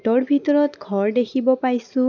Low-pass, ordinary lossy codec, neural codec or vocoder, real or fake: 7.2 kHz; none; none; real